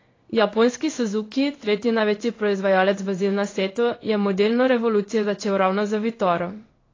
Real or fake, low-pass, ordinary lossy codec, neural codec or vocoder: fake; 7.2 kHz; AAC, 32 kbps; codec, 16 kHz in and 24 kHz out, 1 kbps, XY-Tokenizer